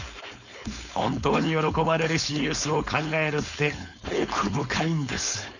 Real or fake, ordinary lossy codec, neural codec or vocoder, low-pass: fake; none; codec, 16 kHz, 4.8 kbps, FACodec; 7.2 kHz